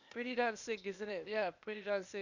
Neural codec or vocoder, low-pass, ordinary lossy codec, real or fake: codec, 16 kHz, 0.8 kbps, ZipCodec; 7.2 kHz; none; fake